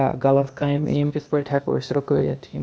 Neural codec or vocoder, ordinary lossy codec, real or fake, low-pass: codec, 16 kHz, 0.8 kbps, ZipCodec; none; fake; none